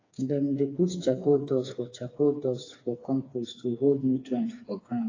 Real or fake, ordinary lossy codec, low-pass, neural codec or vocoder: fake; AAC, 32 kbps; 7.2 kHz; codec, 16 kHz, 4 kbps, FreqCodec, smaller model